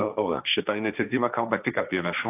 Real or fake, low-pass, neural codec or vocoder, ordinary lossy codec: fake; 3.6 kHz; codec, 16 kHz, 1.1 kbps, Voila-Tokenizer; AAC, 32 kbps